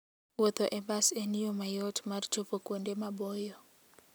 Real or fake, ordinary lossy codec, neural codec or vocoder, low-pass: real; none; none; none